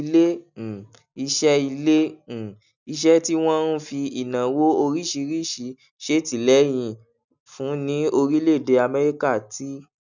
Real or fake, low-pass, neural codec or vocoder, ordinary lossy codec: real; 7.2 kHz; none; none